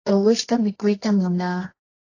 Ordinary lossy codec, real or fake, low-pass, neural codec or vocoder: AAC, 32 kbps; fake; 7.2 kHz; codec, 24 kHz, 0.9 kbps, WavTokenizer, medium music audio release